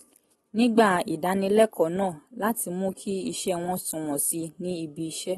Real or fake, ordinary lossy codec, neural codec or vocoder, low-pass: real; AAC, 32 kbps; none; 19.8 kHz